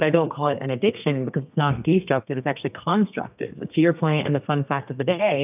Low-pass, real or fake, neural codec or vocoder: 3.6 kHz; fake; codec, 32 kHz, 1.9 kbps, SNAC